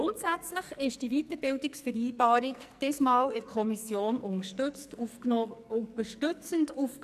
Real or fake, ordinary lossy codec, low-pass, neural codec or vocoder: fake; none; 14.4 kHz; codec, 44.1 kHz, 2.6 kbps, SNAC